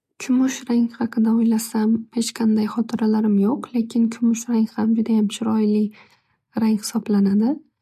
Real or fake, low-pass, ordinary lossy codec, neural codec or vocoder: real; 14.4 kHz; MP3, 64 kbps; none